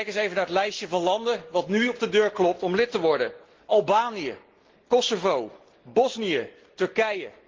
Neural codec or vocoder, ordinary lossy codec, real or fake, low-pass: none; Opus, 16 kbps; real; 7.2 kHz